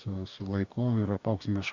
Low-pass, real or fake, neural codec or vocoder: 7.2 kHz; fake; codec, 44.1 kHz, 2.6 kbps, DAC